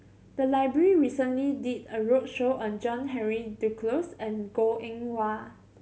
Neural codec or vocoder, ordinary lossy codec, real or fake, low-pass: none; none; real; none